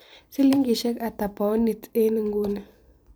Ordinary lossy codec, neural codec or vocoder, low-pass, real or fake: none; vocoder, 44.1 kHz, 128 mel bands every 256 samples, BigVGAN v2; none; fake